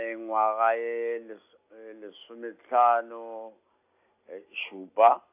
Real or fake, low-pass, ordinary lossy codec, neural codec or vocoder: real; 3.6 kHz; AAC, 32 kbps; none